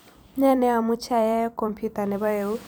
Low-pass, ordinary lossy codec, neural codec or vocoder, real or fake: none; none; vocoder, 44.1 kHz, 128 mel bands every 256 samples, BigVGAN v2; fake